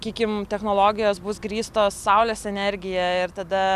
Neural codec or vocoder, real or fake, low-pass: none; real; 14.4 kHz